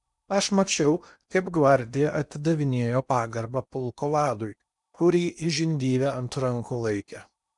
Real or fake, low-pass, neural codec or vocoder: fake; 10.8 kHz; codec, 16 kHz in and 24 kHz out, 0.8 kbps, FocalCodec, streaming, 65536 codes